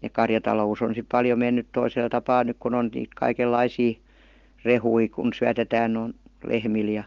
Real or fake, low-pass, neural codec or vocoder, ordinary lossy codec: real; 7.2 kHz; none; Opus, 16 kbps